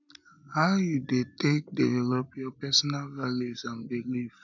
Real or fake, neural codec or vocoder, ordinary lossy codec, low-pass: real; none; none; 7.2 kHz